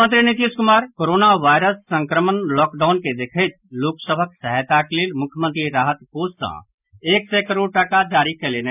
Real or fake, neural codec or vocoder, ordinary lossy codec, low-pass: real; none; none; 3.6 kHz